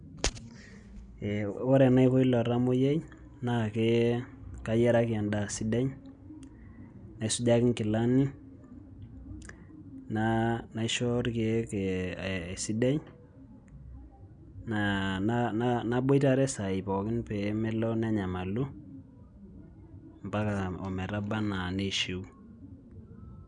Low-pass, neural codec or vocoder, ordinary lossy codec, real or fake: 9.9 kHz; none; none; real